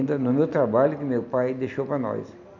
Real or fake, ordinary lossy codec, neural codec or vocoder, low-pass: real; none; none; 7.2 kHz